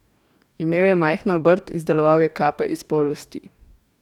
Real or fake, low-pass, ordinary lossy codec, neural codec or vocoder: fake; 19.8 kHz; none; codec, 44.1 kHz, 2.6 kbps, DAC